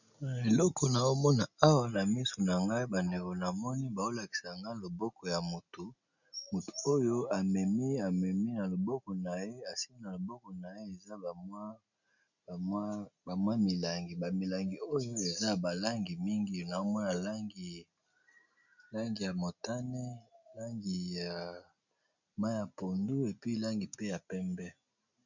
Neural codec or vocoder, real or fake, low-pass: none; real; 7.2 kHz